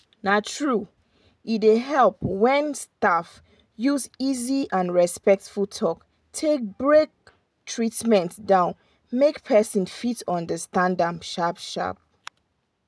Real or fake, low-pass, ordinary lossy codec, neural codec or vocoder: real; none; none; none